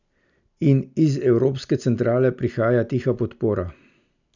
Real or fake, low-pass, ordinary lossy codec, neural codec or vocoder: real; 7.2 kHz; none; none